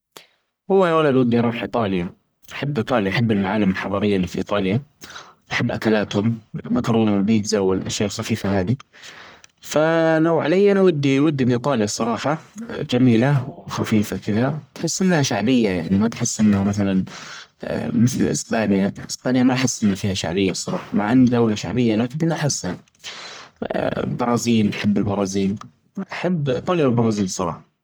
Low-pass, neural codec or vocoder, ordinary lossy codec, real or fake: none; codec, 44.1 kHz, 1.7 kbps, Pupu-Codec; none; fake